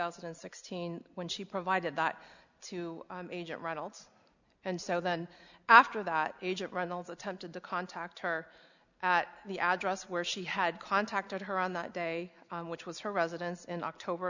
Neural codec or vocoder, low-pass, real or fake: none; 7.2 kHz; real